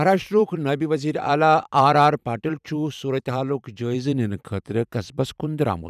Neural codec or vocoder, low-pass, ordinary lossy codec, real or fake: vocoder, 44.1 kHz, 128 mel bands every 512 samples, BigVGAN v2; 14.4 kHz; none; fake